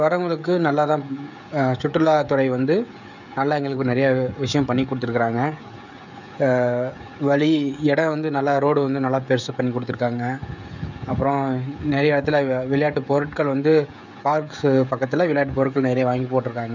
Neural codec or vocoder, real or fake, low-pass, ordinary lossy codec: codec, 16 kHz, 16 kbps, FreqCodec, smaller model; fake; 7.2 kHz; none